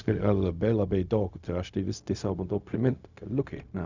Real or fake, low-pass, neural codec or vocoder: fake; 7.2 kHz; codec, 16 kHz, 0.4 kbps, LongCat-Audio-Codec